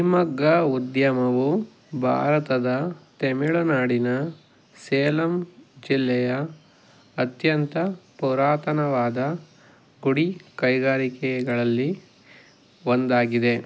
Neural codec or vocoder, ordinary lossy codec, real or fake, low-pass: none; none; real; none